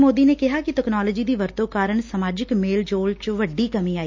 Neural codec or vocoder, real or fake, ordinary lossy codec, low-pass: none; real; MP3, 64 kbps; 7.2 kHz